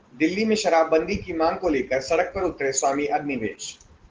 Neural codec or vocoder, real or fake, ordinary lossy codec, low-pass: none; real; Opus, 16 kbps; 7.2 kHz